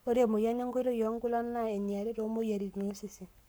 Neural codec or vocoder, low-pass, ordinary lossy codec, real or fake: codec, 44.1 kHz, 7.8 kbps, Pupu-Codec; none; none; fake